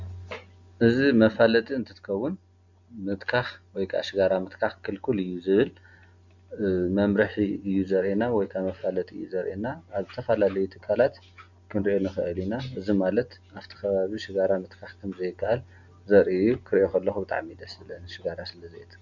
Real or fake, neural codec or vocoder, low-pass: real; none; 7.2 kHz